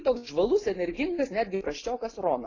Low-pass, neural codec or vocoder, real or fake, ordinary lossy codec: 7.2 kHz; none; real; AAC, 32 kbps